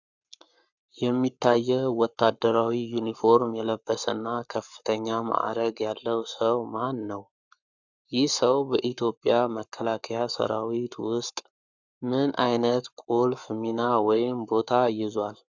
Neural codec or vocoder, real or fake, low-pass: codec, 44.1 kHz, 7.8 kbps, Pupu-Codec; fake; 7.2 kHz